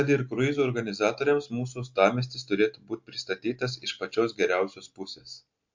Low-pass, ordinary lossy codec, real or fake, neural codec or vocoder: 7.2 kHz; MP3, 48 kbps; real; none